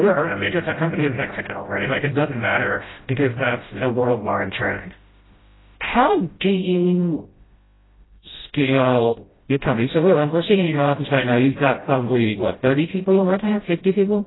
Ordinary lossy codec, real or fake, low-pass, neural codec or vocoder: AAC, 16 kbps; fake; 7.2 kHz; codec, 16 kHz, 0.5 kbps, FreqCodec, smaller model